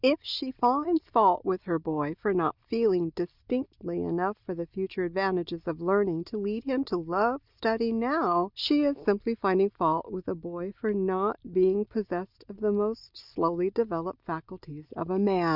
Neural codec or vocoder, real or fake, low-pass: none; real; 5.4 kHz